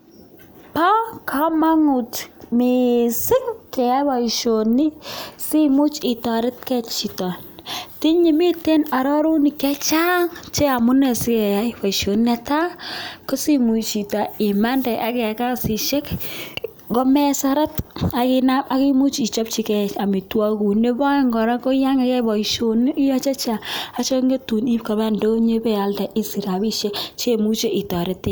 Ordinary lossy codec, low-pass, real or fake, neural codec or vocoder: none; none; real; none